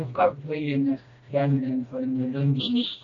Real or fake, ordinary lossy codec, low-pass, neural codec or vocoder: fake; MP3, 64 kbps; 7.2 kHz; codec, 16 kHz, 1 kbps, FreqCodec, smaller model